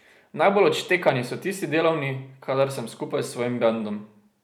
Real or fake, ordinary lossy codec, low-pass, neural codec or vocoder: real; none; none; none